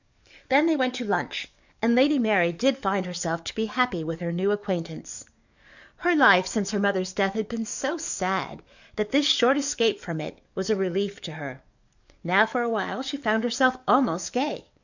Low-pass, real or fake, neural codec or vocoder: 7.2 kHz; fake; codec, 44.1 kHz, 7.8 kbps, DAC